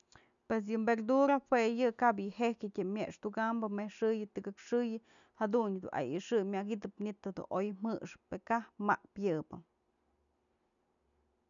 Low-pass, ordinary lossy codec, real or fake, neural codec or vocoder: 7.2 kHz; none; real; none